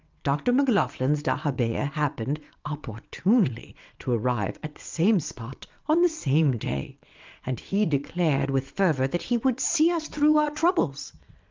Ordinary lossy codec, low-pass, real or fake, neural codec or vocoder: Opus, 32 kbps; 7.2 kHz; fake; vocoder, 22.05 kHz, 80 mel bands, WaveNeXt